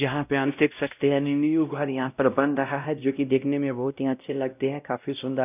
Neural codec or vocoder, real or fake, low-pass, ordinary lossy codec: codec, 16 kHz, 0.5 kbps, X-Codec, WavLM features, trained on Multilingual LibriSpeech; fake; 3.6 kHz; none